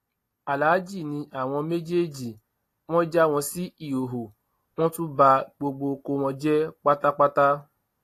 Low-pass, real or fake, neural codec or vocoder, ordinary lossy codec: 14.4 kHz; real; none; AAC, 48 kbps